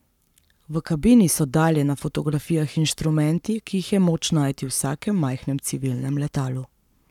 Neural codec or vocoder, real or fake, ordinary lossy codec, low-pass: codec, 44.1 kHz, 7.8 kbps, Pupu-Codec; fake; none; 19.8 kHz